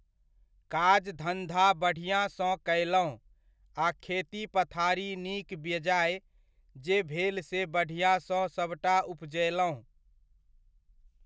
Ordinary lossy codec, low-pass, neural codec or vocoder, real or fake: none; none; none; real